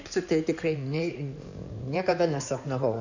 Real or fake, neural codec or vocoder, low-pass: fake; codec, 16 kHz in and 24 kHz out, 2.2 kbps, FireRedTTS-2 codec; 7.2 kHz